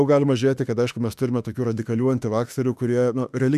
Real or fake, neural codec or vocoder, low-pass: fake; autoencoder, 48 kHz, 32 numbers a frame, DAC-VAE, trained on Japanese speech; 14.4 kHz